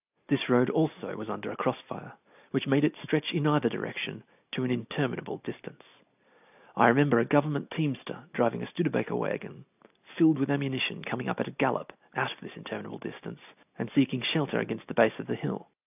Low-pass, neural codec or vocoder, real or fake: 3.6 kHz; none; real